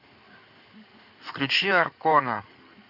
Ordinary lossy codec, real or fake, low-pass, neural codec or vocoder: AAC, 48 kbps; fake; 5.4 kHz; codec, 16 kHz in and 24 kHz out, 2.2 kbps, FireRedTTS-2 codec